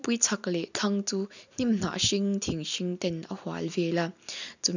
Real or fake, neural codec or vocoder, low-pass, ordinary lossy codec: real; none; 7.2 kHz; none